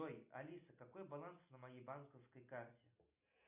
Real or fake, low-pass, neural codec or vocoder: real; 3.6 kHz; none